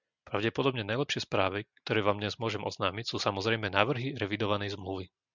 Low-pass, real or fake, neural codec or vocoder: 7.2 kHz; real; none